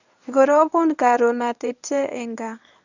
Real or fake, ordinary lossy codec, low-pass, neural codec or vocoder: fake; none; 7.2 kHz; codec, 24 kHz, 0.9 kbps, WavTokenizer, medium speech release version 1